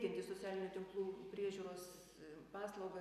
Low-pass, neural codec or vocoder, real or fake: 14.4 kHz; none; real